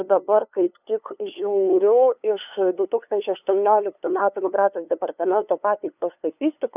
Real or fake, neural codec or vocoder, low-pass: fake; codec, 16 kHz, 2 kbps, FunCodec, trained on LibriTTS, 25 frames a second; 3.6 kHz